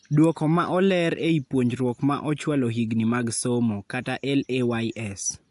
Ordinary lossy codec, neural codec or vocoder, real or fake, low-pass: AAC, 64 kbps; none; real; 10.8 kHz